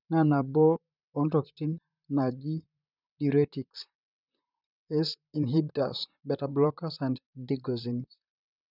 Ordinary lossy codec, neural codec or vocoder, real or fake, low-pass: none; vocoder, 44.1 kHz, 128 mel bands, Pupu-Vocoder; fake; 5.4 kHz